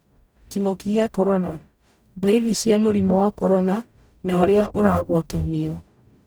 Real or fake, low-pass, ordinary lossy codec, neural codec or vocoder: fake; none; none; codec, 44.1 kHz, 0.9 kbps, DAC